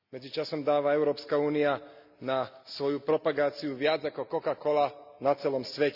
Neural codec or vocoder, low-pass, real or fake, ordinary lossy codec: none; 5.4 kHz; real; none